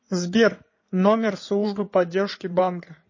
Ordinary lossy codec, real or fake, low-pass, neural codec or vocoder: MP3, 32 kbps; fake; 7.2 kHz; codec, 16 kHz in and 24 kHz out, 2.2 kbps, FireRedTTS-2 codec